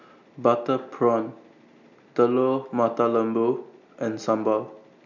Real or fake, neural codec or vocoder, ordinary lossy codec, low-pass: real; none; none; 7.2 kHz